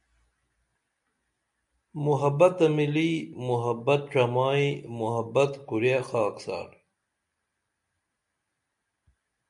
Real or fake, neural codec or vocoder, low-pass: real; none; 10.8 kHz